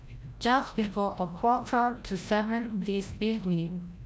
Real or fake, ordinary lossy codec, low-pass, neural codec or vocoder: fake; none; none; codec, 16 kHz, 0.5 kbps, FreqCodec, larger model